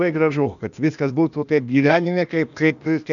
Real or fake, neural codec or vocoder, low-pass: fake; codec, 16 kHz, 0.8 kbps, ZipCodec; 7.2 kHz